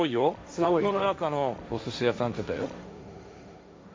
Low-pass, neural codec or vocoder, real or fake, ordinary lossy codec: none; codec, 16 kHz, 1.1 kbps, Voila-Tokenizer; fake; none